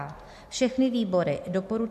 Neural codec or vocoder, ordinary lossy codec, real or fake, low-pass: none; MP3, 64 kbps; real; 14.4 kHz